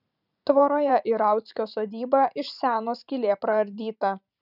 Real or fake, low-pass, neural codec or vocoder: fake; 5.4 kHz; vocoder, 44.1 kHz, 128 mel bands every 512 samples, BigVGAN v2